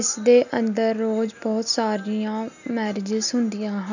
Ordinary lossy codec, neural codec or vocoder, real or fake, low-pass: none; none; real; 7.2 kHz